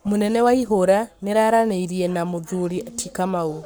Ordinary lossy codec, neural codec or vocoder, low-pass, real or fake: none; codec, 44.1 kHz, 7.8 kbps, Pupu-Codec; none; fake